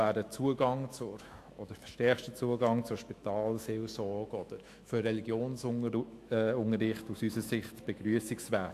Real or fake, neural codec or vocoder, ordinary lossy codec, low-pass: fake; autoencoder, 48 kHz, 128 numbers a frame, DAC-VAE, trained on Japanese speech; AAC, 64 kbps; 14.4 kHz